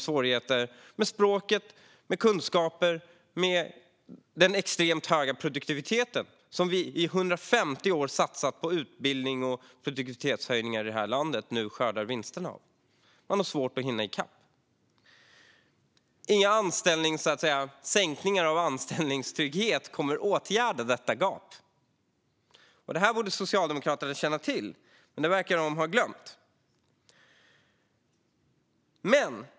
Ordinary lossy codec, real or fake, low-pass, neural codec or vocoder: none; real; none; none